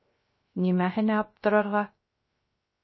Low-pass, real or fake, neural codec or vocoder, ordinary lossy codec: 7.2 kHz; fake; codec, 16 kHz, 0.3 kbps, FocalCodec; MP3, 24 kbps